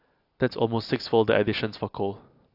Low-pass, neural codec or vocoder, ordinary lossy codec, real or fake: 5.4 kHz; none; AAC, 48 kbps; real